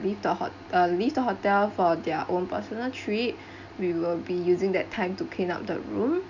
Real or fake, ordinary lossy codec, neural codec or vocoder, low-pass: real; none; none; 7.2 kHz